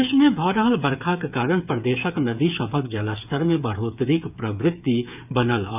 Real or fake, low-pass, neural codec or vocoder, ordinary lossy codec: fake; 3.6 kHz; codec, 16 kHz, 8 kbps, FreqCodec, smaller model; none